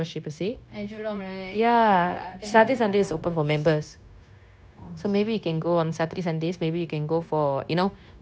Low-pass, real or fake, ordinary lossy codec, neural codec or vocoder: none; fake; none; codec, 16 kHz, 0.9 kbps, LongCat-Audio-Codec